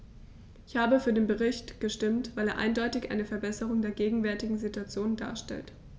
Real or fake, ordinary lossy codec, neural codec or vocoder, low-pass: real; none; none; none